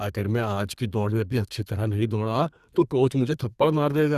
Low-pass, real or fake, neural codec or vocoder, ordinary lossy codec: 14.4 kHz; fake; codec, 32 kHz, 1.9 kbps, SNAC; Opus, 64 kbps